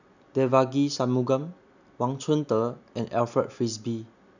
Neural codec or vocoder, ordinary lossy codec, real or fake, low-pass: none; none; real; 7.2 kHz